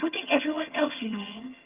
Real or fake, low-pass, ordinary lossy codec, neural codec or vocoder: fake; 3.6 kHz; Opus, 32 kbps; vocoder, 22.05 kHz, 80 mel bands, HiFi-GAN